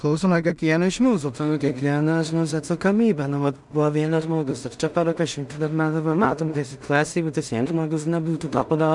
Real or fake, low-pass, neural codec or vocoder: fake; 10.8 kHz; codec, 16 kHz in and 24 kHz out, 0.4 kbps, LongCat-Audio-Codec, two codebook decoder